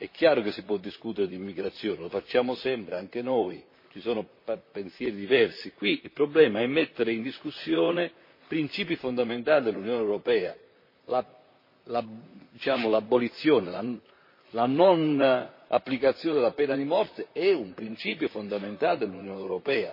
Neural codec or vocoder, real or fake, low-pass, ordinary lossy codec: vocoder, 44.1 kHz, 128 mel bands, Pupu-Vocoder; fake; 5.4 kHz; MP3, 24 kbps